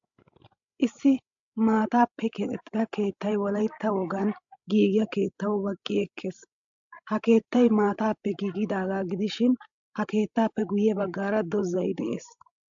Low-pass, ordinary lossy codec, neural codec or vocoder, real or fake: 7.2 kHz; AAC, 64 kbps; codec, 16 kHz, 16 kbps, FreqCodec, larger model; fake